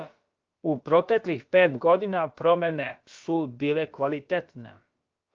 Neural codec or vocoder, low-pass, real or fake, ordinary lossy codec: codec, 16 kHz, about 1 kbps, DyCAST, with the encoder's durations; 7.2 kHz; fake; Opus, 24 kbps